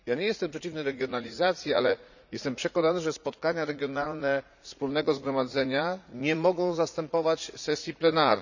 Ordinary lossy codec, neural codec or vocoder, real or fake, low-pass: none; vocoder, 44.1 kHz, 80 mel bands, Vocos; fake; 7.2 kHz